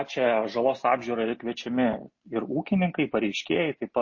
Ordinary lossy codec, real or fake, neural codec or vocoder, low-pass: MP3, 32 kbps; real; none; 7.2 kHz